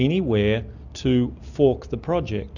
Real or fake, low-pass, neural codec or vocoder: real; 7.2 kHz; none